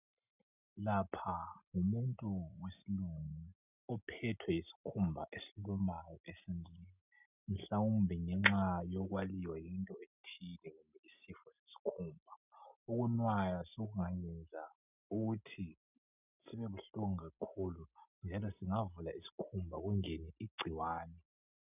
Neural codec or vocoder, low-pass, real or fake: none; 3.6 kHz; real